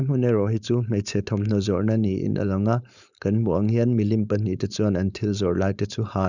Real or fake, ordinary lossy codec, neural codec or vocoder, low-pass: fake; none; codec, 16 kHz, 4.8 kbps, FACodec; 7.2 kHz